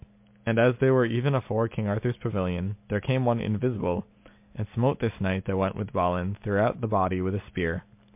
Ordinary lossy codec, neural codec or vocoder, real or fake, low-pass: MP3, 32 kbps; none; real; 3.6 kHz